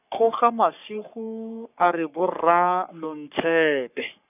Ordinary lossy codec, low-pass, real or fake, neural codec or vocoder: none; 3.6 kHz; fake; codec, 44.1 kHz, 3.4 kbps, Pupu-Codec